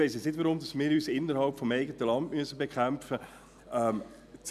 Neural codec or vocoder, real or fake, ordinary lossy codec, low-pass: none; real; none; 14.4 kHz